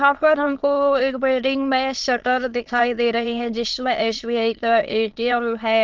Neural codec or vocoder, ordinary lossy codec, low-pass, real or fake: autoencoder, 22.05 kHz, a latent of 192 numbers a frame, VITS, trained on many speakers; Opus, 16 kbps; 7.2 kHz; fake